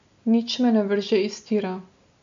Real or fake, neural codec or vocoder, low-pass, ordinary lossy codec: real; none; 7.2 kHz; MP3, 64 kbps